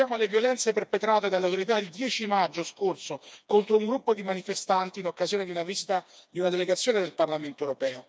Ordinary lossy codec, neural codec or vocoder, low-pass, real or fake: none; codec, 16 kHz, 2 kbps, FreqCodec, smaller model; none; fake